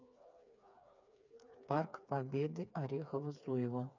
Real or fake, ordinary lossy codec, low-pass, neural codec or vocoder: fake; none; 7.2 kHz; codec, 16 kHz, 4 kbps, FreqCodec, smaller model